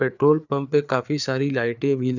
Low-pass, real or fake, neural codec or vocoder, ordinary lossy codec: 7.2 kHz; fake; codec, 16 kHz, 4 kbps, FreqCodec, larger model; none